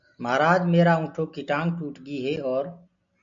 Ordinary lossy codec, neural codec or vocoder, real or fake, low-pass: AAC, 64 kbps; none; real; 7.2 kHz